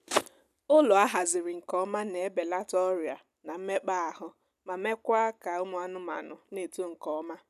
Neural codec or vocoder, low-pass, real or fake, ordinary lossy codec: none; 14.4 kHz; real; none